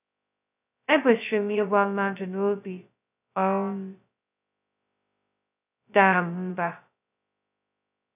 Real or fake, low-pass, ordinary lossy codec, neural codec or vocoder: fake; 3.6 kHz; none; codec, 16 kHz, 0.2 kbps, FocalCodec